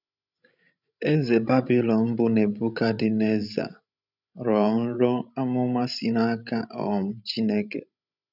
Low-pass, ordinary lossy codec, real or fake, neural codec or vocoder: 5.4 kHz; none; fake; codec, 16 kHz, 16 kbps, FreqCodec, larger model